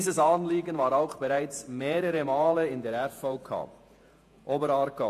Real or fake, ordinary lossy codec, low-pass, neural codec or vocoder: fake; AAC, 64 kbps; 14.4 kHz; vocoder, 48 kHz, 128 mel bands, Vocos